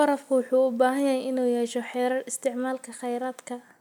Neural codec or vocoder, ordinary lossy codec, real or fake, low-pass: none; none; real; 19.8 kHz